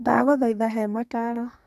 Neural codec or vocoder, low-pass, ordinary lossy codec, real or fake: codec, 44.1 kHz, 2.6 kbps, SNAC; 14.4 kHz; none; fake